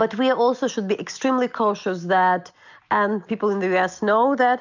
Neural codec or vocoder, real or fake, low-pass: none; real; 7.2 kHz